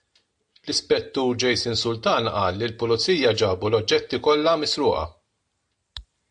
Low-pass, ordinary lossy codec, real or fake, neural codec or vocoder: 9.9 kHz; AAC, 64 kbps; real; none